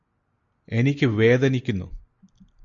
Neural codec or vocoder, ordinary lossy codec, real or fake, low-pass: none; AAC, 64 kbps; real; 7.2 kHz